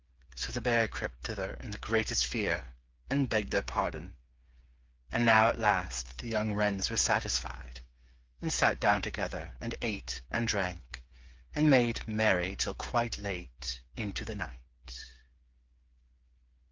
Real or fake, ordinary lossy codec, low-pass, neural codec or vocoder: fake; Opus, 32 kbps; 7.2 kHz; codec, 16 kHz, 8 kbps, FreqCodec, smaller model